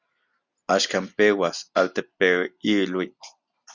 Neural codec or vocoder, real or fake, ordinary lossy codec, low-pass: none; real; Opus, 64 kbps; 7.2 kHz